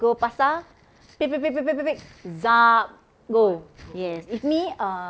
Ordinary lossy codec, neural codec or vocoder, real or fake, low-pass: none; none; real; none